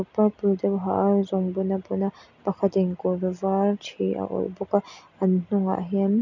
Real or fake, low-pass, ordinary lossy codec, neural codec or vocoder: real; 7.2 kHz; none; none